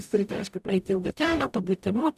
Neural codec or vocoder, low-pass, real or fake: codec, 44.1 kHz, 0.9 kbps, DAC; 14.4 kHz; fake